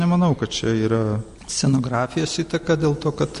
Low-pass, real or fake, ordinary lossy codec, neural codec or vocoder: 14.4 kHz; real; MP3, 48 kbps; none